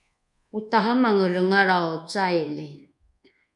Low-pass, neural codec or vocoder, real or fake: 10.8 kHz; codec, 24 kHz, 1.2 kbps, DualCodec; fake